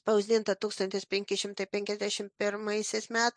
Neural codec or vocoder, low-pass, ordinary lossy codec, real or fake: none; 9.9 kHz; MP3, 64 kbps; real